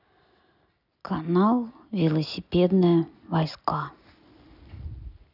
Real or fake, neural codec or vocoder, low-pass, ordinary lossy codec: real; none; 5.4 kHz; none